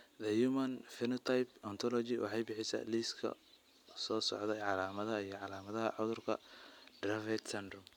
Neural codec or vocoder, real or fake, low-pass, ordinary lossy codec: none; real; 19.8 kHz; none